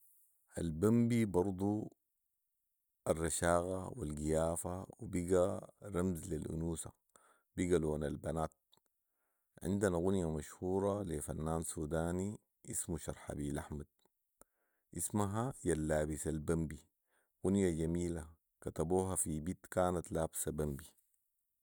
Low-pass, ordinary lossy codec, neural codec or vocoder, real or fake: none; none; none; real